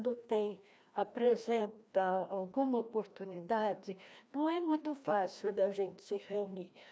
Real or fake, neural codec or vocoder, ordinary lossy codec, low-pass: fake; codec, 16 kHz, 1 kbps, FreqCodec, larger model; none; none